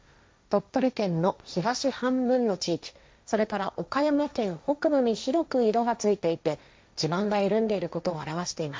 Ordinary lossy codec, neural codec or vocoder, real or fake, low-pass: none; codec, 16 kHz, 1.1 kbps, Voila-Tokenizer; fake; none